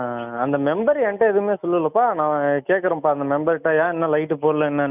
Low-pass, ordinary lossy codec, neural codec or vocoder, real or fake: 3.6 kHz; none; none; real